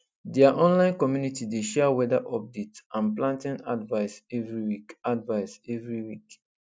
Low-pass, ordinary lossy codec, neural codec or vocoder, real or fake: none; none; none; real